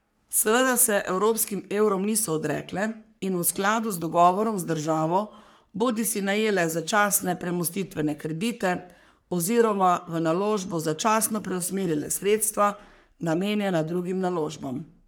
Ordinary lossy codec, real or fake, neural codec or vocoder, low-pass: none; fake; codec, 44.1 kHz, 3.4 kbps, Pupu-Codec; none